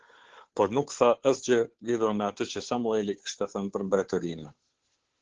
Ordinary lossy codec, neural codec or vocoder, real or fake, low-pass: Opus, 16 kbps; codec, 16 kHz, 2 kbps, FunCodec, trained on Chinese and English, 25 frames a second; fake; 7.2 kHz